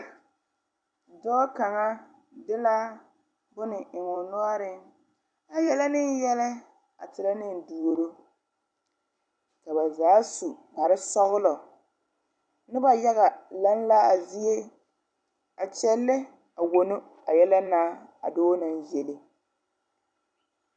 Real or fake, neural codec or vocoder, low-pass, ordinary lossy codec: fake; vocoder, 44.1 kHz, 128 mel bands every 256 samples, BigVGAN v2; 9.9 kHz; MP3, 96 kbps